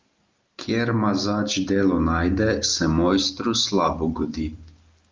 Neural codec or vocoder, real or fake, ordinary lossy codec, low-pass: none; real; Opus, 32 kbps; 7.2 kHz